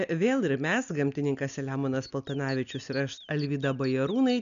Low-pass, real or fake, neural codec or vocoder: 7.2 kHz; real; none